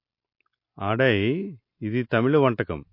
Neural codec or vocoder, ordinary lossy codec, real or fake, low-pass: none; MP3, 32 kbps; real; 5.4 kHz